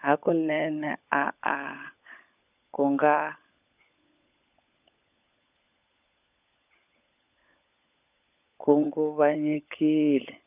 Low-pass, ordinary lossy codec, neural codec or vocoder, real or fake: 3.6 kHz; none; codec, 16 kHz, 16 kbps, FunCodec, trained on LibriTTS, 50 frames a second; fake